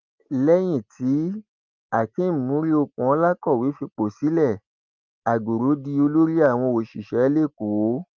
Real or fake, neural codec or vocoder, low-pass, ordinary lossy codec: real; none; 7.2 kHz; Opus, 32 kbps